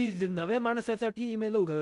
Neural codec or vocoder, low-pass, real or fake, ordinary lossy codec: codec, 16 kHz in and 24 kHz out, 0.6 kbps, FocalCodec, streaming, 2048 codes; 10.8 kHz; fake; none